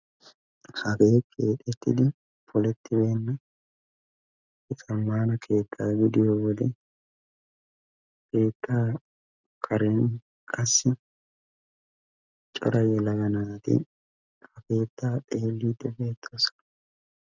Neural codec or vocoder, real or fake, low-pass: none; real; 7.2 kHz